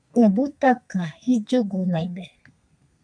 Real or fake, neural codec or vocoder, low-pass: fake; codec, 32 kHz, 1.9 kbps, SNAC; 9.9 kHz